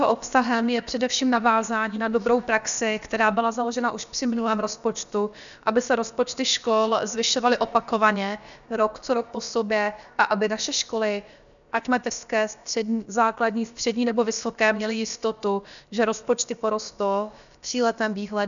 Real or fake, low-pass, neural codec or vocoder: fake; 7.2 kHz; codec, 16 kHz, about 1 kbps, DyCAST, with the encoder's durations